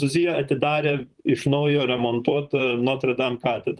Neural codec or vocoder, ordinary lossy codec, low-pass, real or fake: vocoder, 44.1 kHz, 128 mel bands, Pupu-Vocoder; Opus, 24 kbps; 10.8 kHz; fake